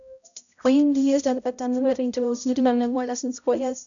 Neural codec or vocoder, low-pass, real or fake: codec, 16 kHz, 0.5 kbps, X-Codec, HuBERT features, trained on balanced general audio; 7.2 kHz; fake